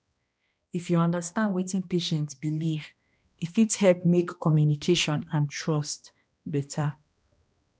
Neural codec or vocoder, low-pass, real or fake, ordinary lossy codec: codec, 16 kHz, 1 kbps, X-Codec, HuBERT features, trained on balanced general audio; none; fake; none